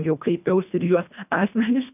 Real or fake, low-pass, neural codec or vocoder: fake; 3.6 kHz; codec, 24 kHz, 1.5 kbps, HILCodec